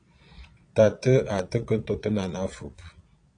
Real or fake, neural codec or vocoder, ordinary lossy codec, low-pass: fake; vocoder, 22.05 kHz, 80 mel bands, Vocos; AAC, 48 kbps; 9.9 kHz